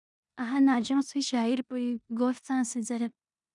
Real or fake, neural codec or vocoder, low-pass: fake; codec, 16 kHz in and 24 kHz out, 0.9 kbps, LongCat-Audio-Codec, four codebook decoder; 10.8 kHz